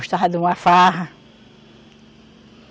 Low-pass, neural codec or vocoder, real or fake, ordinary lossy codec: none; none; real; none